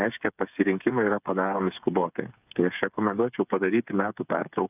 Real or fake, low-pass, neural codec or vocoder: fake; 3.6 kHz; codec, 16 kHz, 8 kbps, FreqCodec, smaller model